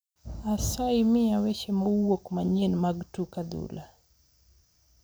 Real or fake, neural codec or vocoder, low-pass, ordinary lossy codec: real; none; none; none